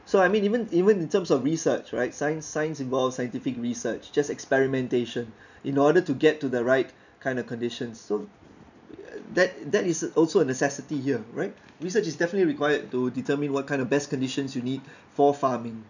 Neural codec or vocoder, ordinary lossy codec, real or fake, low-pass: none; none; real; 7.2 kHz